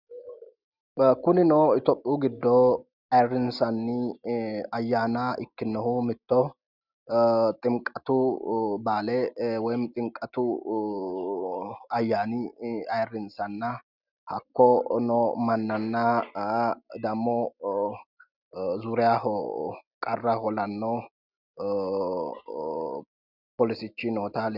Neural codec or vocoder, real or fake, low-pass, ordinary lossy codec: none; real; 5.4 kHz; Opus, 64 kbps